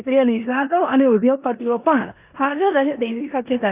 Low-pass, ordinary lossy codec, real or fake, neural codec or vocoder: 3.6 kHz; Opus, 64 kbps; fake; codec, 16 kHz in and 24 kHz out, 0.9 kbps, LongCat-Audio-Codec, four codebook decoder